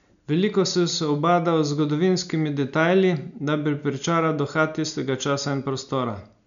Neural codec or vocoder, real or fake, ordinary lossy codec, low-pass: none; real; none; 7.2 kHz